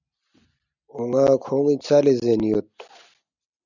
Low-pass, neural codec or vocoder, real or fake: 7.2 kHz; none; real